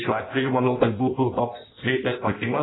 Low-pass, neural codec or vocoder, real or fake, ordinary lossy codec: 7.2 kHz; codec, 16 kHz in and 24 kHz out, 0.6 kbps, FireRedTTS-2 codec; fake; AAC, 16 kbps